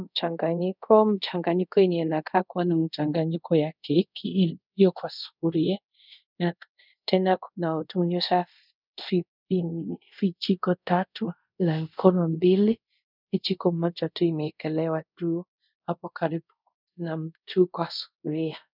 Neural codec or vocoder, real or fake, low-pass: codec, 24 kHz, 0.5 kbps, DualCodec; fake; 5.4 kHz